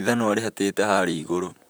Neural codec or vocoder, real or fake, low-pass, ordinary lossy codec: vocoder, 44.1 kHz, 128 mel bands, Pupu-Vocoder; fake; none; none